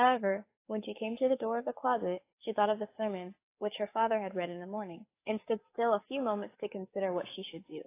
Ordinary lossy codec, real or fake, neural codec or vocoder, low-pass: AAC, 24 kbps; fake; codec, 44.1 kHz, 7.8 kbps, DAC; 3.6 kHz